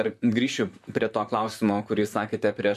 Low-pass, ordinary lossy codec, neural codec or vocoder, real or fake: 14.4 kHz; MP3, 64 kbps; vocoder, 44.1 kHz, 128 mel bands, Pupu-Vocoder; fake